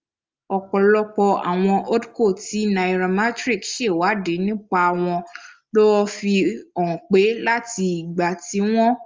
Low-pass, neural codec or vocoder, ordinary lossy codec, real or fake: 7.2 kHz; none; Opus, 32 kbps; real